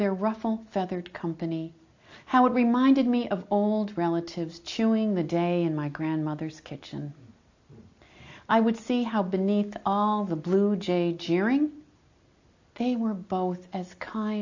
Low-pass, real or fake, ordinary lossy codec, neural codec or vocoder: 7.2 kHz; real; AAC, 48 kbps; none